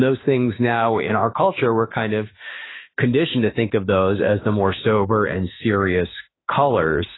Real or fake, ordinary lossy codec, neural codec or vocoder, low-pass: fake; AAC, 16 kbps; autoencoder, 48 kHz, 32 numbers a frame, DAC-VAE, trained on Japanese speech; 7.2 kHz